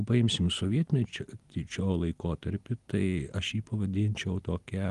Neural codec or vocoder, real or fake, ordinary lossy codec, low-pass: none; real; Opus, 24 kbps; 10.8 kHz